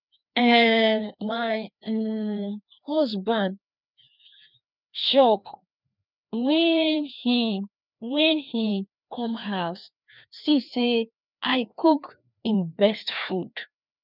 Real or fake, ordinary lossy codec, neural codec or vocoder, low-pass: fake; none; codec, 16 kHz, 2 kbps, FreqCodec, larger model; 5.4 kHz